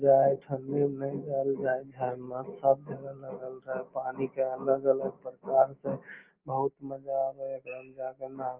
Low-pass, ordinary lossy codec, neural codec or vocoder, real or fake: 3.6 kHz; Opus, 24 kbps; none; real